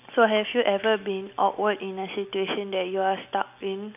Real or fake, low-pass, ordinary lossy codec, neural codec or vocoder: real; 3.6 kHz; none; none